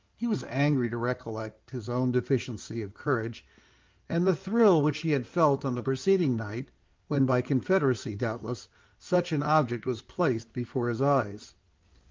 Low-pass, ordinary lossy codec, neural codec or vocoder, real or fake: 7.2 kHz; Opus, 32 kbps; codec, 16 kHz in and 24 kHz out, 2.2 kbps, FireRedTTS-2 codec; fake